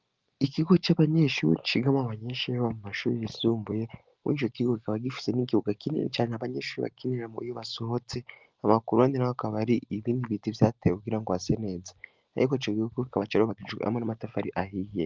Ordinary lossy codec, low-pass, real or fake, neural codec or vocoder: Opus, 32 kbps; 7.2 kHz; real; none